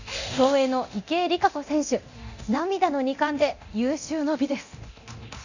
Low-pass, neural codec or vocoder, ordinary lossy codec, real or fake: 7.2 kHz; codec, 24 kHz, 0.9 kbps, DualCodec; none; fake